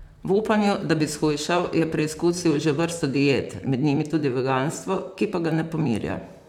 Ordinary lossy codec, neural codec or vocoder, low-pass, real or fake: none; vocoder, 44.1 kHz, 128 mel bands, Pupu-Vocoder; 19.8 kHz; fake